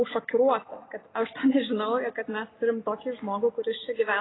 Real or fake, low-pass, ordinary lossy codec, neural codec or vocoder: real; 7.2 kHz; AAC, 16 kbps; none